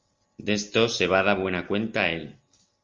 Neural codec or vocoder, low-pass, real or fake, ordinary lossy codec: none; 7.2 kHz; real; Opus, 32 kbps